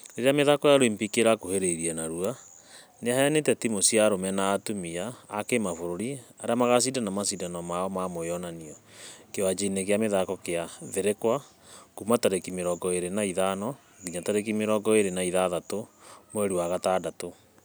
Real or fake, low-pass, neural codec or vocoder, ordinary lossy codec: real; none; none; none